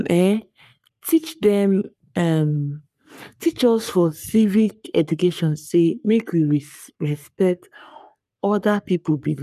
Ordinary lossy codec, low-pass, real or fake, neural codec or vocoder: none; 14.4 kHz; fake; codec, 44.1 kHz, 3.4 kbps, Pupu-Codec